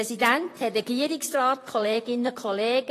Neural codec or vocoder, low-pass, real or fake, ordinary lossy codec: vocoder, 44.1 kHz, 128 mel bands, Pupu-Vocoder; 14.4 kHz; fake; AAC, 48 kbps